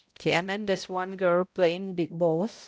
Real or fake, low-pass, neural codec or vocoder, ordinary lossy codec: fake; none; codec, 16 kHz, 0.5 kbps, X-Codec, HuBERT features, trained on balanced general audio; none